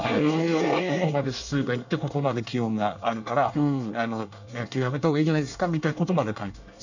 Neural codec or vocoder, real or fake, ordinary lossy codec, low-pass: codec, 24 kHz, 1 kbps, SNAC; fake; none; 7.2 kHz